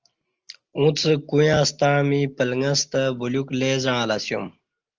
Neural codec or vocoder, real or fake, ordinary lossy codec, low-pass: none; real; Opus, 24 kbps; 7.2 kHz